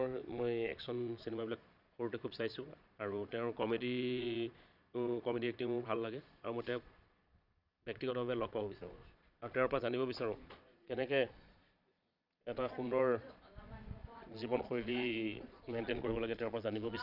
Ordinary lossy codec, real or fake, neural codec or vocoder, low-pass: none; fake; vocoder, 22.05 kHz, 80 mel bands, Vocos; 5.4 kHz